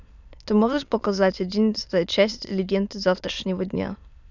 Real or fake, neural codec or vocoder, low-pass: fake; autoencoder, 22.05 kHz, a latent of 192 numbers a frame, VITS, trained on many speakers; 7.2 kHz